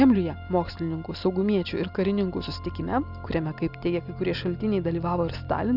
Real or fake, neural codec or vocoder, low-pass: real; none; 5.4 kHz